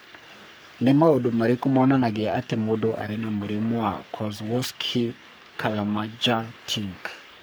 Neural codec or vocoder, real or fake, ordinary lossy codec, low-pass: codec, 44.1 kHz, 3.4 kbps, Pupu-Codec; fake; none; none